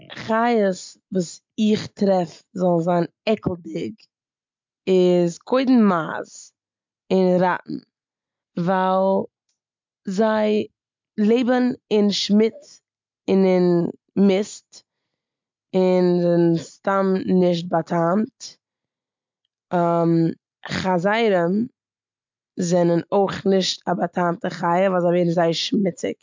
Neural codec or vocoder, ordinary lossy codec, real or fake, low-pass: none; MP3, 64 kbps; real; 7.2 kHz